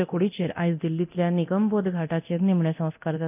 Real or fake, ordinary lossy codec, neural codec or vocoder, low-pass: fake; none; codec, 24 kHz, 0.9 kbps, DualCodec; 3.6 kHz